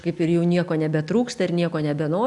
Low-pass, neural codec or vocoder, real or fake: 10.8 kHz; none; real